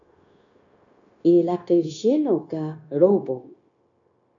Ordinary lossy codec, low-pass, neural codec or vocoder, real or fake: AAC, 64 kbps; 7.2 kHz; codec, 16 kHz, 0.9 kbps, LongCat-Audio-Codec; fake